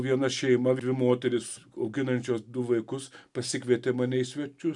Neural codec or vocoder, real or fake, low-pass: none; real; 10.8 kHz